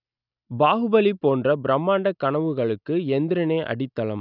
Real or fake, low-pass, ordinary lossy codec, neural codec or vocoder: real; 5.4 kHz; none; none